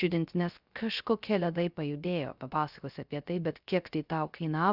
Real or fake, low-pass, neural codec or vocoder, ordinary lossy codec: fake; 5.4 kHz; codec, 16 kHz, 0.3 kbps, FocalCodec; Opus, 64 kbps